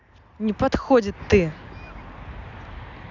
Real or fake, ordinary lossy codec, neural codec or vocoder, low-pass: real; none; none; 7.2 kHz